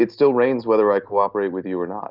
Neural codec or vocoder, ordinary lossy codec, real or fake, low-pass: none; Opus, 24 kbps; real; 5.4 kHz